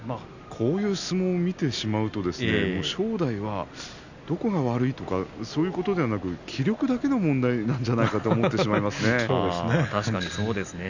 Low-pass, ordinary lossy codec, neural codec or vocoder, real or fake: 7.2 kHz; none; none; real